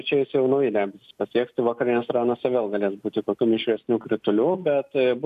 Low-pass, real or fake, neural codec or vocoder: 14.4 kHz; real; none